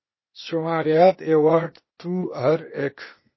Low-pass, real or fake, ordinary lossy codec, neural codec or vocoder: 7.2 kHz; fake; MP3, 24 kbps; codec, 16 kHz, 0.8 kbps, ZipCodec